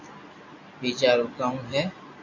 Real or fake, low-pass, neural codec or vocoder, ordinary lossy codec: real; 7.2 kHz; none; AAC, 48 kbps